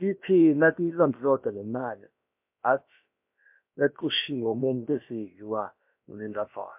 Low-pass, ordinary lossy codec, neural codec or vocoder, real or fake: 3.6 kHz; MP3, 32 kbps; codec, 16 kHz, about 1 kbps, DyCAST, with the encoder's durations; fake